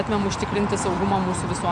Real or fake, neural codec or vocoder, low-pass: real; none; 9.9 kHz